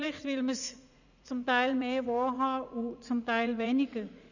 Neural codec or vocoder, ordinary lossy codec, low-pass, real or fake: vocoder, 44.1 kHz, 80 mel bands, Vocos; none; 7.2 kHz; fake